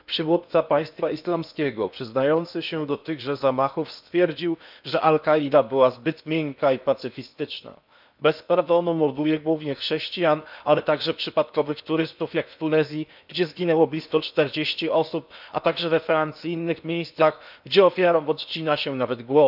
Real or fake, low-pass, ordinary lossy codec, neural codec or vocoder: fake; 5.4 kHz; none; codec, 16 kHz in and 24 kHz out, 0.8 kbps, FocalCodec, streaming, 65536 codes